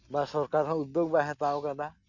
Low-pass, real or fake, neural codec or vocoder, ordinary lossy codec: 7.2 kHz; real; none; none